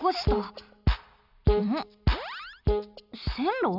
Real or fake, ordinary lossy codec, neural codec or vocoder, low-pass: real; none; none; 5.4 kHz